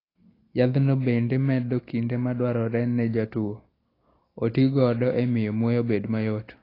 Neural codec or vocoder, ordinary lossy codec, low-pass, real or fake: none; AAC, 24 kbps; 5.4 kHz; real